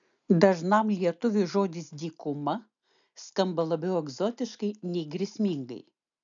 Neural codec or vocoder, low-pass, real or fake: none; 7.2 kHz; real